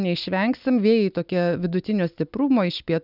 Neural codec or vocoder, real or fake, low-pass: none; real; 5.4 kHz